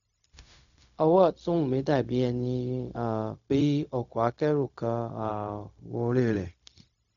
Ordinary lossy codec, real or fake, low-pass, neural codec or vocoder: none; fake; 7.2 kHz; codec, 16 kHz, 0.4 kbps, LongCat-Audio-Codec